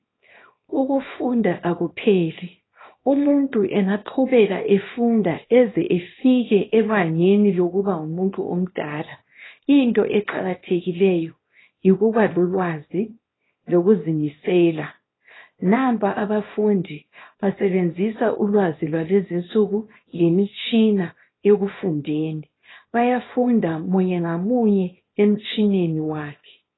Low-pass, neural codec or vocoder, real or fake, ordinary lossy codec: 7.2 kHz; codec, 24 kHz, 0.9 kbps, WavTokenizer, small release; fake; AAC, 16 kbps